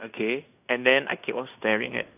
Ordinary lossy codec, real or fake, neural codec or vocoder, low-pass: none; fake; codec, 16 kHz in and 24 kHz out, 0.9 kbps, LongCat-Audio-Codec, fine tuned four codebook decoder; 3.6 kHz